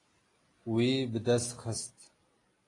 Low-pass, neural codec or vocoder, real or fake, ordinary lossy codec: 10.8 kHz; none; real; AAC, 32 kbps